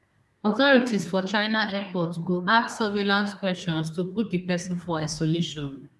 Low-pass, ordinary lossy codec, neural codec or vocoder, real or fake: none; none; codec, 24 kHz, 1 kbps, SNAC; fake